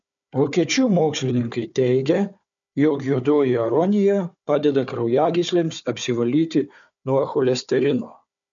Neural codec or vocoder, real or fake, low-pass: codec, 16 kHz, 4 kbps, FunCodec, trained on Chinese and English, 50 frames a second; fake; 7.2 kHz